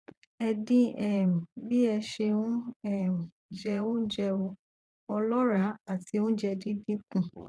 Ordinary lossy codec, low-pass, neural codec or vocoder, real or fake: none; none; vocoder, 22.05 kHz, 80 mel bands, Vocos; fake